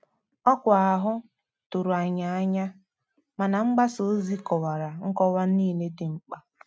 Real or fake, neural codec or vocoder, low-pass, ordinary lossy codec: real; none; none; none